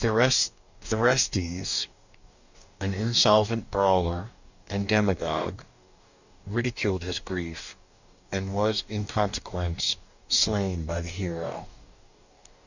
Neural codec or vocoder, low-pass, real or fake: codec, 44.1 kHz, 2.6 kbps, DAC; 7.2 kHz; fake